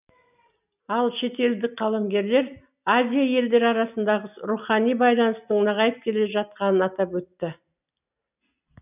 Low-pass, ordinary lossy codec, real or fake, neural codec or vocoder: 3.6 kHz; none; real; none